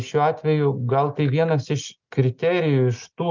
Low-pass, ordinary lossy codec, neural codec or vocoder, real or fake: 7.2 kHz; Opus, 32 kbps; none; real